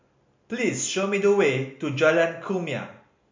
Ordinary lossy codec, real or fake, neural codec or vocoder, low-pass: MP3, 48 kbps; real; none; 7.2 kHz